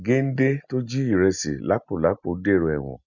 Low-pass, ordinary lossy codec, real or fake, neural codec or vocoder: 7.2 kHz; none; real; none